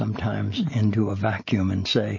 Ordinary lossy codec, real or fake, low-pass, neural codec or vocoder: MP3, 32 kbps; real; 7.2 kHz; none